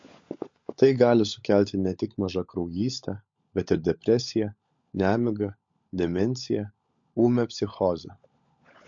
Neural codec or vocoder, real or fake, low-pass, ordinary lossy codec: codec, 16 kHz, 16 kbps, FunCodec, trained on LibriTTS, 50 frames a second; fake; 7.2 kHz; MP3, 48 kbps